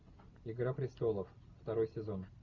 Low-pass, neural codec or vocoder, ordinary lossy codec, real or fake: 7.2 kHz; none; Opus, 64 kbps; real